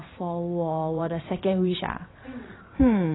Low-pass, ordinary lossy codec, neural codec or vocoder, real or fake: 7.2 kHz; AAC, 16 kbps; vocoder, 22.05 kHz, 80 mel bands, WaveNeXt; fake